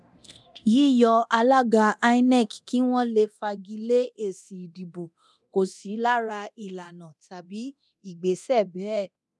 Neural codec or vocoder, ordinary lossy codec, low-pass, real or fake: codec, 24 kHz, 0.9 kbps, DualCodec; none; none; fake